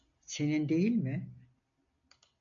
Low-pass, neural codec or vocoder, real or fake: 7.2 kHz; none; real